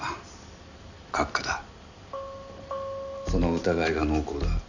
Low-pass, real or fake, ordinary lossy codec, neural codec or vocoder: 7.2 kHz; real; none; none